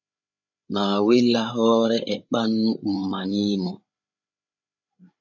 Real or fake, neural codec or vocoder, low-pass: fake; codec, 16 kHz, 8 kbps, FreqCodec, larger model; 7.2 kHz